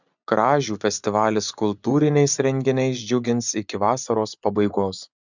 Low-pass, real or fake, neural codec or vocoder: 7.2 kHz; fake; vocoder, 24 kHz, 100 mel bands, Vocos